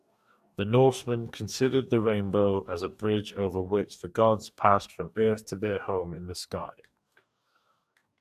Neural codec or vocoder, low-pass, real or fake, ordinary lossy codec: codec, 44.1 kHz, 2.6 kbps, DAC; 14.4 kHz; fake; AAC, 96 kbps